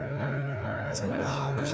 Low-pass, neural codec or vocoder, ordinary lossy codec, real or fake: none; codec, 16 kHz, 2 kbps, FreqCodec, larger model; none; fake